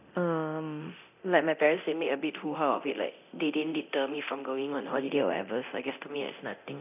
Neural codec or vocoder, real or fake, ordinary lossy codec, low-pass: codec, 24 kHz, 0.9 kbps, DualCodec; fake; none; 3.6 kHz